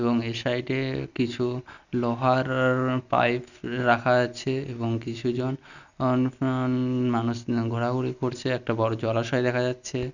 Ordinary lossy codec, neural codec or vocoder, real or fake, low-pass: none; none; real; 7.2 kHz